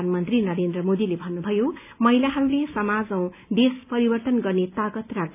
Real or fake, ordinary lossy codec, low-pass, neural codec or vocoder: real; none; 3.6 kHz; none